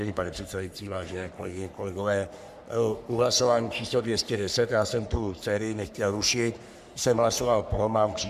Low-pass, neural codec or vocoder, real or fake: 14.4 kHz; codec, 44.1 kHz, 3.4 kbps, Pupu-Codec; fake